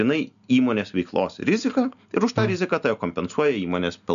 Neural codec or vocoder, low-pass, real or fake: none; 7.2 kHz; real